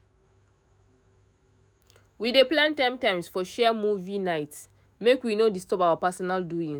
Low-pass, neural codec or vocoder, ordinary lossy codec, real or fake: none; autoencoder, 48 kHz, 128 numbers a frame, DAC-VAE, trained on Japanese speech; none; fake